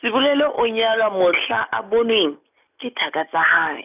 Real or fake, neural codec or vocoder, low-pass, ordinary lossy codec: real; none; 3.6 kHz; none